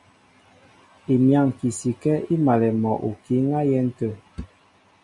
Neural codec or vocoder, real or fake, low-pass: none; real; 10.8 kHz